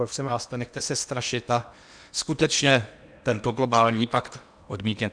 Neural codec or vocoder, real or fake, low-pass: codec, 16 kHz in and 24 kHz out, 0.8 kbps, FocalCodec, streaming, 65536 codes; fake; 9.9 kHz